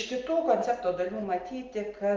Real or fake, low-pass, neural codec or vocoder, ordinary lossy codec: real; 7.2 kHz; none; Opus, 24 kbps